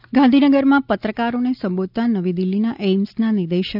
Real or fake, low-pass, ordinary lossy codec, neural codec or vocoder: real; 5.4 kHz; none; none